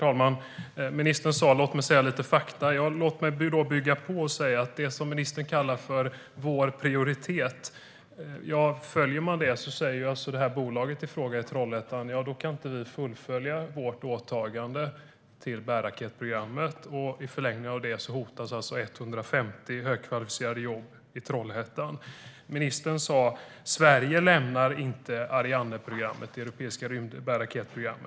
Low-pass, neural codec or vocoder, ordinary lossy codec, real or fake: none; none; none; real